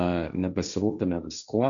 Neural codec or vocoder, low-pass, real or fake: codec, 16 kHz, 1.1 kbps, Voila-Tokenizer; 7.2 kHz; fake